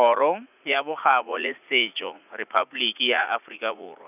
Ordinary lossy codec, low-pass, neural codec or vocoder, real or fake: none; 3.6 kHz; vocoder, 44.1 kHz, 80 mel bands, Vocos; fake